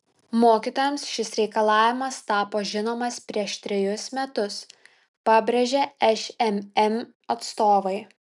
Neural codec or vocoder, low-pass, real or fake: none; 10.8 kHz; real